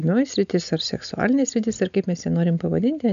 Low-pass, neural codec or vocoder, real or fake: 7.2 kHz; none; real